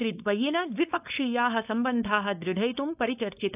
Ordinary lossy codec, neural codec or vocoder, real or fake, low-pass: AAC, 32 kbps; codec, 16 kHz, 4.8 kbps, FACodec; fake; 3.6 kHz